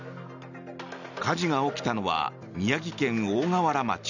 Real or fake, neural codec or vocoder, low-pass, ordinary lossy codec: real; none; 7.2 kHz; none